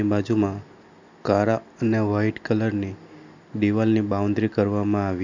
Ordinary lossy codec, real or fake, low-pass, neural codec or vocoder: Opus, 64 kbps; real; 7.2 kHz; none